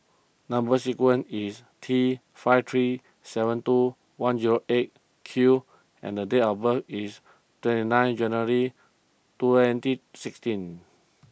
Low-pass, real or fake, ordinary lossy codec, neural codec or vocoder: none; real; none; none